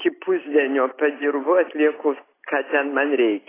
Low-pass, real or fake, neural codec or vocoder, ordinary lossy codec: 3.6 kHz; real; none; AAC, 16 kbps